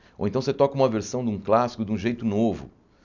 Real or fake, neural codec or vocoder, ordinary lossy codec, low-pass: real; none; none; 7.2 kHz